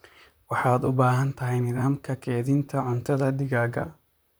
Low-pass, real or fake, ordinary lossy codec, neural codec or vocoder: none; fake; none; vocoder, 44.1 kHz, 128 mel bands, Pupu-Vocoder